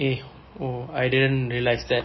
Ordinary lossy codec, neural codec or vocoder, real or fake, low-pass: MP3, 24 kbps; none; real; 7.2 kHz